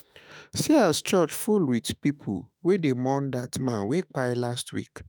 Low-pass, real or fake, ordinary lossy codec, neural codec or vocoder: none; fake; none; autoencoder, 48 kHz, 32 numbers a frame, DAC-VAE, trained on Japanese speech